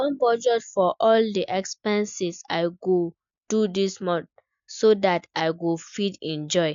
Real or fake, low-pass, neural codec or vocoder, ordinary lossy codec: real; 7.2 kHz; none; MP3, 64 kbps